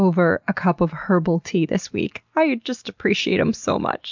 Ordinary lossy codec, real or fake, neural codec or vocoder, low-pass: MP3, 64 kbps; real; none; 7.2 kHz